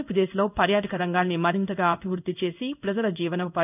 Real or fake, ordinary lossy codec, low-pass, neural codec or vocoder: fake; none; 3.6 kHz; codec, 16 kHz in and 24 kHz out, 1 kbps, XY-Tokenizer